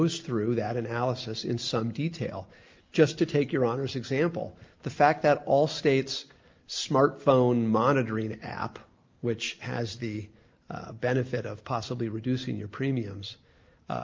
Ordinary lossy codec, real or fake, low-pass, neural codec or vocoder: Opus, 24 kbps; real; 7.2 kHz; none